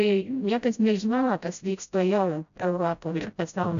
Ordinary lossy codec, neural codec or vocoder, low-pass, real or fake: AAC, 96 kbps; codec, 16 kHz, 0.5 kbps, FreqCodec, smaller model; 7.2 kHz; fake